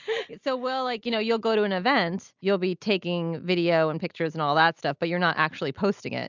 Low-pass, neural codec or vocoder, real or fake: 7.2 kHz; none; real